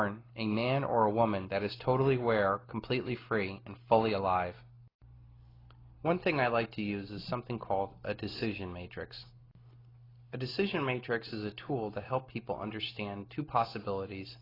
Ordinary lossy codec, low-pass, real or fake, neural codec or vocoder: AAC, 24 kbps; 5.4 kHz; real; none